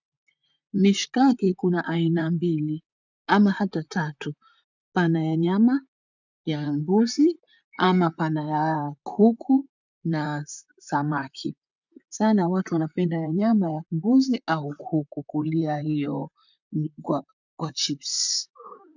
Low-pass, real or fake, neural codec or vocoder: 7.2 kHz; fake; vocoder, 44.1 kHz, 128 mel bands, Pupu-Vocoder